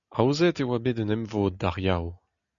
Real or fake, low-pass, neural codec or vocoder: real; 7.2 kHz; none